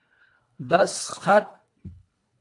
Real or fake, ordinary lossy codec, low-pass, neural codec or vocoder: fake; AAC, 48 kbps; 10.8 kHz; codec, 24 kHz, 1.5 kbps, HILCodec